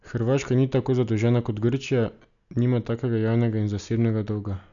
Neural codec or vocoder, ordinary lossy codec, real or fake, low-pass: none; none; real; 7.2 kHz